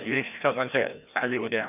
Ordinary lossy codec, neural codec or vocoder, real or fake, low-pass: none; codec, 16 kHz, 1 kbps, FreqCodec, larger model; fake; 3.6 kHz